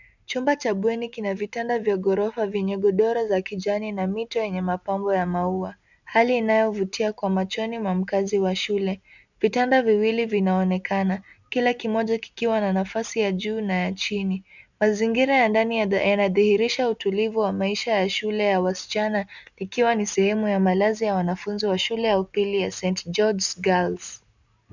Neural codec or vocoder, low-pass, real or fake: none; 7.2 kHz; real